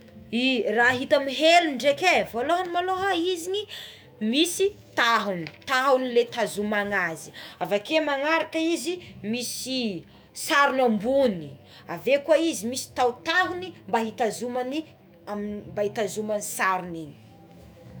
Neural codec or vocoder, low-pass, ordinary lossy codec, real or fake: autoencoder, 48 kHz, 128 numbers a frame, DAC-VAE, trained on Japanese speech; none; none; fake